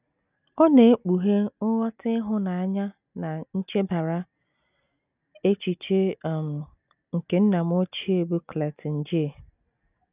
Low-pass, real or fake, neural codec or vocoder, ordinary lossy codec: 3.6 kHz; real; none; none